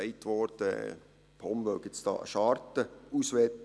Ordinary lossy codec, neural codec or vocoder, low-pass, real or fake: none; none; none; real